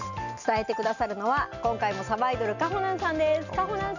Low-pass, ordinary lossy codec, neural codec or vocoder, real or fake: 7.2 kHz; none; none; real